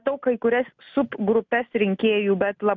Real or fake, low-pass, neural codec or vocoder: real; 7.2 kHz; none